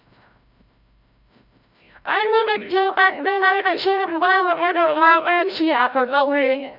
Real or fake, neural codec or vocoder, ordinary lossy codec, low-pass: fake; codec, 16 kHz, 0.5 kbps, FreqCodec, larger model; none; 5.4 kHz